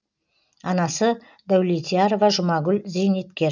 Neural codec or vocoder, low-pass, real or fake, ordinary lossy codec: none; 7.2 kHz; real; none